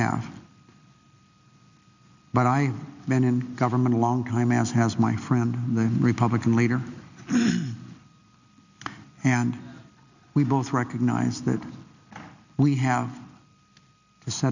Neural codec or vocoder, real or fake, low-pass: none; real; 7.2 kHz